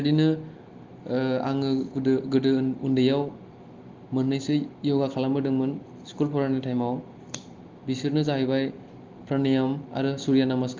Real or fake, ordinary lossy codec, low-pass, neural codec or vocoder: real; Opus, 32 kbps; 7.2 kHz; none